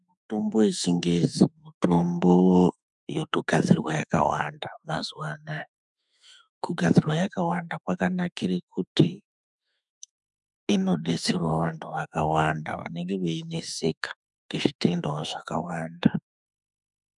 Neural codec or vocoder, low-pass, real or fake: autoencoder, 48 kHz, 32 numbers a frame, DAC-VAE, trained on Japanese speech; 10.8 kHz; fake